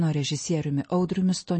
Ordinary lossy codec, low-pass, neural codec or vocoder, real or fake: MP3, 32 kbps; 9.9 kHz; none; real